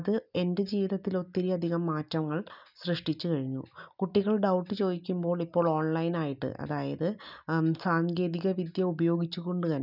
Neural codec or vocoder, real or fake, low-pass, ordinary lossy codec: none; real; 5.4 kHz; none